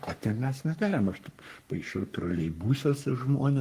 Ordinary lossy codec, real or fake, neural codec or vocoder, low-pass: Opus, 24 kbps; fake; codec, 44.1 kHz, 3.4 kbps, Pupu-Codec; 14.4 kHz